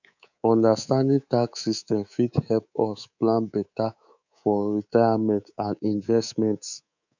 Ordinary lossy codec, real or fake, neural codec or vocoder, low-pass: none; fake; codec, 24 kHz, 3.1 kbps, DualCodec; 7.2 kHz